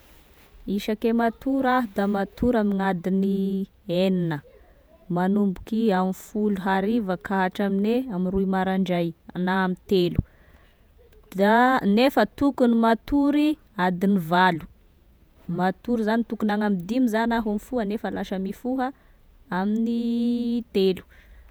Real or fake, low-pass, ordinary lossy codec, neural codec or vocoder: fake; none; none; vocoder, 48 kHz, 128 mel bands, Vocos